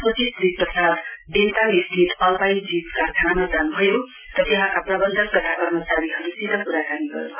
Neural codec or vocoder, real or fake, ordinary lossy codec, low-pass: none; real; none; 3.6 kHz